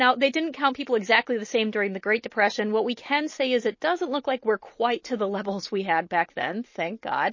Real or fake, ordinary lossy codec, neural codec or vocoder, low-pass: real; MP3, 32 kbps; none; 7.2 kHz